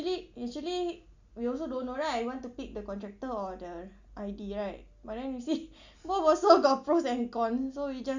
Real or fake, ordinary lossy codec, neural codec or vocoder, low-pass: real; Opus, 64 kbps; none; 7.2 kHz